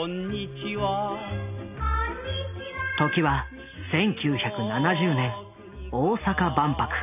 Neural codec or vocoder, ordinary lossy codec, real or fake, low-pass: none; none; real; 3.6 kHz